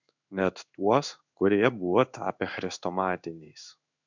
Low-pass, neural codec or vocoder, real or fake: 7.2 kHz; codec, 16 kHz in and 24 kHz out, 1 kbps, XY-Tokenizer; fake